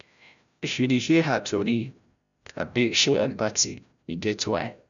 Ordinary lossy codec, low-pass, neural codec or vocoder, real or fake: none; 7.2 kHz; codec, 16 kHz, 0.5 kbps, FreqCodec, larger model; fake